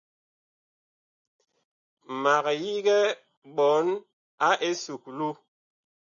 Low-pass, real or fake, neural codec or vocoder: 7.2 kHz; real; none